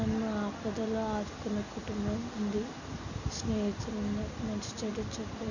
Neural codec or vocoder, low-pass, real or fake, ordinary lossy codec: none; 7.2 kHz; real; none